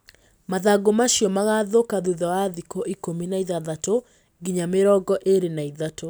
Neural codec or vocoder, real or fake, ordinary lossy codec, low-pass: none; real; none; none